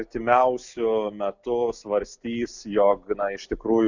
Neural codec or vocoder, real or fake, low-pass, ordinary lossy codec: none; real; 7.2 kHz; Opus, 64 kbps